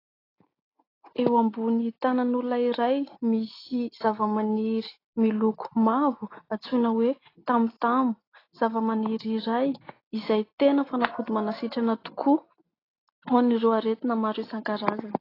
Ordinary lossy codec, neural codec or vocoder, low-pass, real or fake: AAC, 24 kbps; none; 5.4 kHz; real